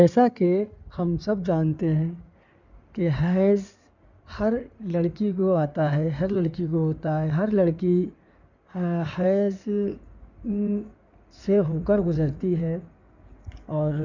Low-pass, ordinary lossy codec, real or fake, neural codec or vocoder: 7.2 kHz; none; fake; codec, 16 kHz in and 24 kHz out, 2.2 kbps, FireRedTTS-2 codec